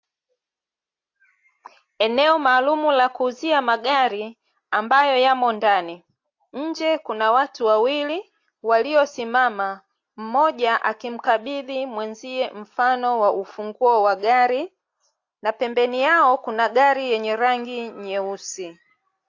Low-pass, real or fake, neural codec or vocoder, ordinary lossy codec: 7.2 kHz; real; none; AAC, 48 kbps